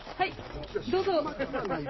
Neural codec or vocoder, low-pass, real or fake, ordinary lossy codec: none; 7.2 kHz; real; MP3, 24 kbps